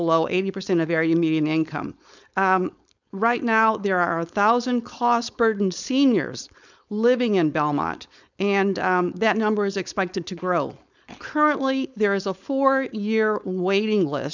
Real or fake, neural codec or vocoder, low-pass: fake; codec, 16 kHz, 4.8 kbps, FACodec; 7.2 kHz